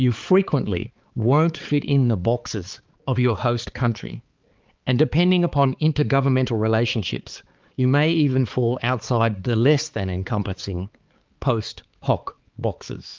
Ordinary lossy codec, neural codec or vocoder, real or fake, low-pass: Opus, 32 kbps; codec, 16 kHz, 4 kbps, X-Codec, HuBERT features, trained on balanced general audio; fake; 7.2 kHz